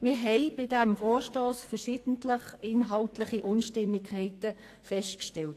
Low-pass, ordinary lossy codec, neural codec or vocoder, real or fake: 14.4 kHz; AAC, 64 kbps; codec, 44.1 kHz, 2.6 kbps, SNAC; fake